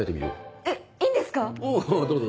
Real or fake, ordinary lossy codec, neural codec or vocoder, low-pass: real; none; none; none